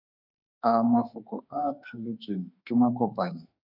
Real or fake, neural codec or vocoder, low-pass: fake; autoencoder, 48 kHz, 32 numbers a frame, DAC-VAE, trained on Japanese speech; 5.4 kHz